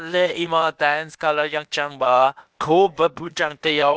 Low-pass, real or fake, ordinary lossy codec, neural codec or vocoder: none; fake; none; codec, 16 kHz, 0.8 kbps, ZipCodec